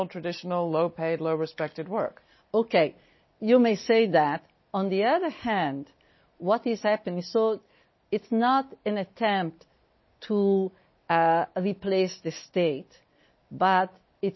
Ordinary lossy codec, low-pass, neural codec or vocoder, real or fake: MP3, 24 kbps; 7.2 kHz; none; real